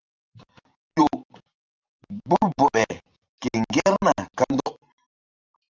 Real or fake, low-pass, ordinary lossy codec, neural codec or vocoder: fake; 7.2 kHz; Opus, 32 kbps; vocoder, 44.1 kHz, 128 mel bands every 512 samples, BigVGAN v2